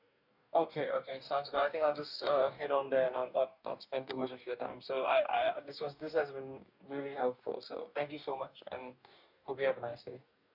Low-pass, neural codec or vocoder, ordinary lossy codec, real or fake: 5.4 kHz; codec, 44.1 kHz, 2.6 kbps, DAC; none; fake